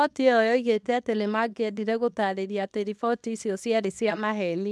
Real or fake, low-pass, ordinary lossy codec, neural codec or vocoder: fake; none; none; codec, 24 kHz, 0.9 kbps, WavTokenizer, small release